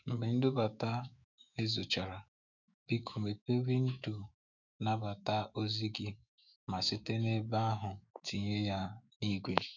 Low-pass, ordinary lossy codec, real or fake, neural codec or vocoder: 7.2 kHz; none; fake; autoencoder, 48 kHz, 128 numbers a frame, DAC-VAE, trained on Japanese speech